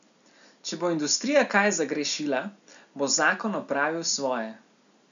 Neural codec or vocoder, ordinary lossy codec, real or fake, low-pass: none; none; real; 7.2 kHz